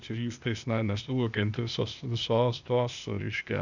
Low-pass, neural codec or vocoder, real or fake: 7.2 kHz; codec, 16 kHz, 0.8 kbps, ZipCodec; fake